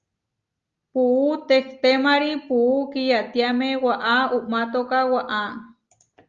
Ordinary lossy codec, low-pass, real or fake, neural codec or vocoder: Opus, 32 kbps; 7.2 kHz; real; none